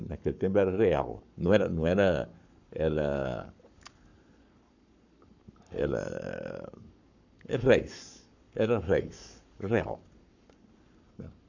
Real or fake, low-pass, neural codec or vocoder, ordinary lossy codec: fake; 7.2 kHz; codec, 44.1 kHz, 7.8 kbps, Pupu-Codec; none